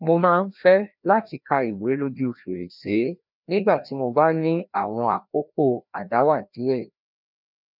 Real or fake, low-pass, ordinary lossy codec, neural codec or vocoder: fake; 5.4 kHz; none; codec, 16 kHz, 1 kbps, FreqCodec, larger model